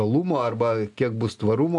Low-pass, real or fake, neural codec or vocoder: 10.8 kHz; real; none